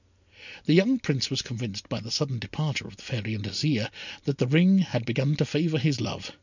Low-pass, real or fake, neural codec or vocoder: 7.2 kHz; real; none